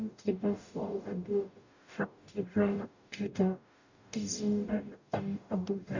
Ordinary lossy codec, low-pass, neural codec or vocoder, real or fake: none; 7.2 kHz; codec, 44.1 kHz, 0.9 kbps, DAC; fake